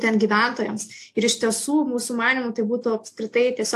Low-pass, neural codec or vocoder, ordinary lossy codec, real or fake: 14.4 kHz; none; AAC, 64 kbps; real